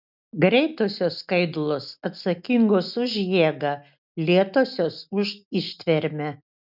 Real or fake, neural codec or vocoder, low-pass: real; none; 5.4 kHz